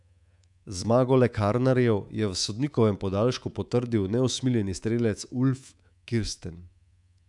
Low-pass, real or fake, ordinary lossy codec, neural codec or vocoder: 10.8 kHz; fake; none; codec, 24 kHz, 3.1 kbps, DualCodec